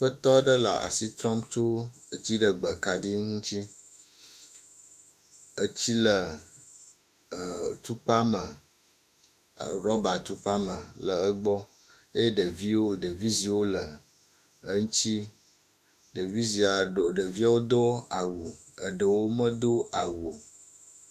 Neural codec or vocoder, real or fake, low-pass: autoencoder, 48 kHz, 32 numbers a frame, DAC-VAE, trained on Japanese speech; fake; 14.4 kHz